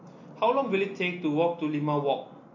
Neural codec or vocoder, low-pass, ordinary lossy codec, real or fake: none; 7.2 kHz; MP3, 48 kbps; real